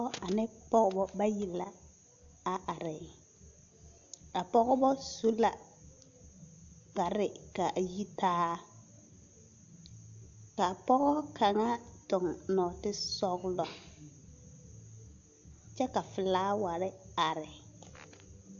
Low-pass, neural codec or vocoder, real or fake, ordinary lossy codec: 7.2 kHz; none; real; AAC, 64 kbps